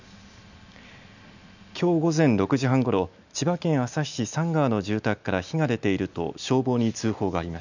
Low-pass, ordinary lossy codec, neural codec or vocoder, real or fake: 7.2 kHz; none; none; real